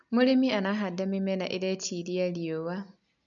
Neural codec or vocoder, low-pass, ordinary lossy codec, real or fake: none; 7.2 kHz; none; real